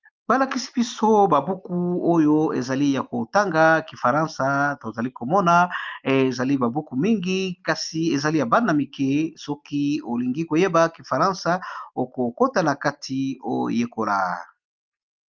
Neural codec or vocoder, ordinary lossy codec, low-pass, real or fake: none; Opus, 24 kbps; 7.2 kHz; real